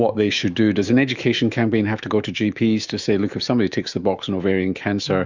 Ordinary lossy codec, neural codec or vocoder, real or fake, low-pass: Opus, 64 kbps; autoencoder, 48 kHz, 128 numbers a frame, DAC-VAE, trained on Japanese speech; fake; 7.2 kHz